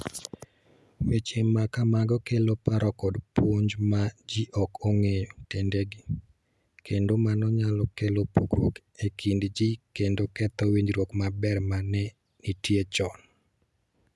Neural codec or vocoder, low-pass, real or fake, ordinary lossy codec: none; none; real; none